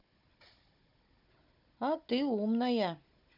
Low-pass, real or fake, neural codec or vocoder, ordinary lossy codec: 5.4 kHz; fake; codec, 16 kHz, 16 kbps, FunCodec, trained on Chinese and English, 50 frames a second; none